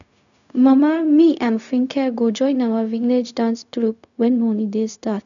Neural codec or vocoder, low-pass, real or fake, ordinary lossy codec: codec, 16 kHz, 0.4 kbps, LongCat-Audio-Codec; 7.2 kHz; fake; none